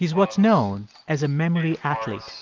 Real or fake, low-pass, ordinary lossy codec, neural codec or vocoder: real; 7.2 kHz; Opus, 24 kbps; none